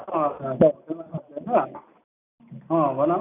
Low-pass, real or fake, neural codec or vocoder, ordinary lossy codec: 3.6 kHz; real; none; none